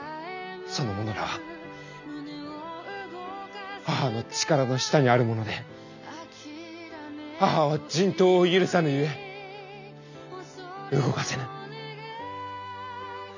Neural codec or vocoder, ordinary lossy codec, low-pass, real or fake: none; none; 7.2 kHz; real